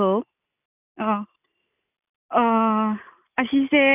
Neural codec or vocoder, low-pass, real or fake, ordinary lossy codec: none; 3.6 kHz; real; none